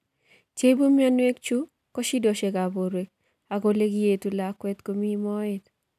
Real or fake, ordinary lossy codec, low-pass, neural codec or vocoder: real; none; 14.4 kHz; none